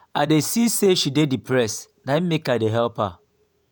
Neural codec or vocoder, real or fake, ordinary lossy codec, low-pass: vocoder, 48 kHz, 128 mel bands, Vocos; fake; none; none